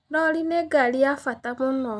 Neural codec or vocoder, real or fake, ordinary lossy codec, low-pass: none; real; none; none